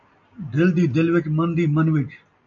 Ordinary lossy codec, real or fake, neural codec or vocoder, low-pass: AAC, 64 kbps; real; none; 7.2 kHz